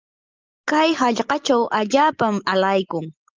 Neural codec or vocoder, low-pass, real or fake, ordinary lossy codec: none; 7.2 kHz; real; Opus, 24 kbps